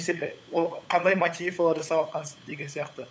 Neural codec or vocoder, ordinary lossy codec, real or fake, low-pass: codec, 16 kHz, 8 kbps, FunCodec, trained on LibriTTS, 25 frames a second; none; fake; none